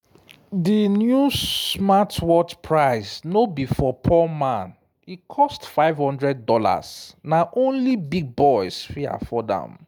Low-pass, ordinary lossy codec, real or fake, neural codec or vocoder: none; none; real; none